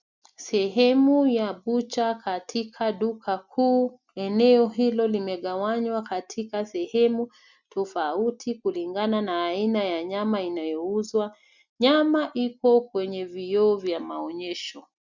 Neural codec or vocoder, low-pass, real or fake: none; 7.2 kHz; real